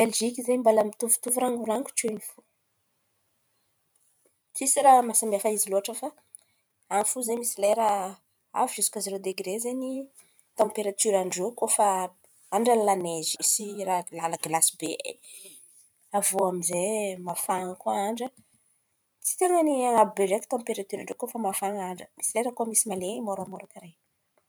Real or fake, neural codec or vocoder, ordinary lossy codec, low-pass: fake; vocoder, 44.1 kHz, 128 mel bands every 512 samples, BigVGAN v2; none; none